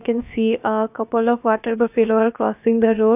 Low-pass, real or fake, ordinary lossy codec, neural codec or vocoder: 3.6 kHz; fake; none; codec, 16 kHz, about 1 kbps, DyCAST, with the encoder's durations